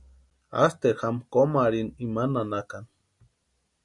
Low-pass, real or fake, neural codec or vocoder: 10.8 kHz; real; none